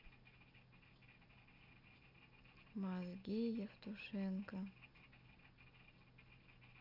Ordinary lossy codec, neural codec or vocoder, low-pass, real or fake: AAC, 32 kbps; none; 5.4 kHz; real